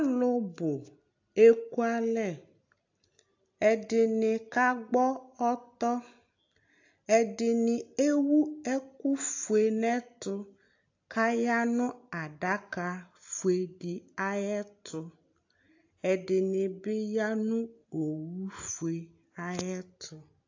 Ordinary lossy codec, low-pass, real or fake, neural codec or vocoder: AAC, 48 kbps; 7.2 kHz; real; none